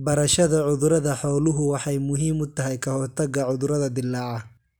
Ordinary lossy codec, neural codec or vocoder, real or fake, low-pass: none; none; real; none